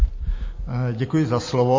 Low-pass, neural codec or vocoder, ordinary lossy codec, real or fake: 7.2 kHz; none; MP3, 32 kbps; real